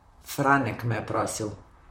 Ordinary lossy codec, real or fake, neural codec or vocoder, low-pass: MP3, 64 kbps; fake; vocoder, 44.1 kHz, 128 mel bands, Pupu-Vocoder; 19.8 kHz